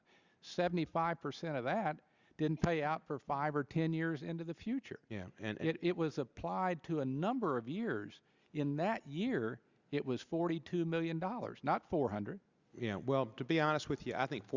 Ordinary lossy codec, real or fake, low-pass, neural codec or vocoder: Opus, 64 kbps; real; 7.2 kHz; none